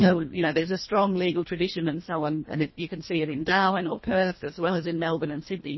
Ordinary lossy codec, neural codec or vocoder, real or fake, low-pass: MP3, 24 kbps; codec, 24 kHz, 1.5 kbps, HILCodec; fake; 7.2 kHz